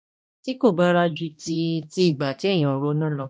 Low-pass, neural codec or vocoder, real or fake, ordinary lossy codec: none; codec, 16 kHz, 1 kbps, X-Codec, HuBERT features, trained on balanced general audio; fake; none